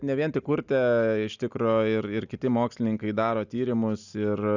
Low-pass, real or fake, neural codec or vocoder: 7.2 kHz; real; none